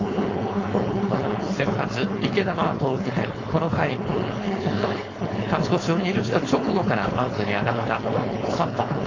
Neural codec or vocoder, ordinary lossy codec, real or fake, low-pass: codec, 16 kHz, 4.8 kbps, FACodec; AAC, 32 kbps; fake; 7.2 kHz